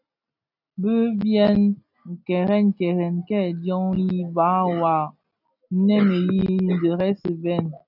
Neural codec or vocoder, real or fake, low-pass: none; real; 5.4 kHz